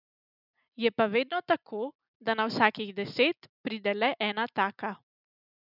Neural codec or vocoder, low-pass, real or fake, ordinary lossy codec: none; 5.4 kHz; real; none